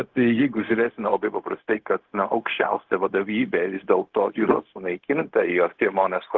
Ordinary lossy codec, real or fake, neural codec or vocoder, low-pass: Opus, 16 kbps; fake; codec, 16 kHz, 0.4 kbps, LongCat-Audio-Codec; 7.2 kHz